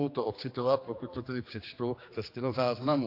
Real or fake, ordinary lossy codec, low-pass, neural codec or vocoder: fake; AAC, 32 kbps; 5.4 kHz; codec, 16 kHz, 2 kbps, X-Codec, HuBERT features, trained on general audio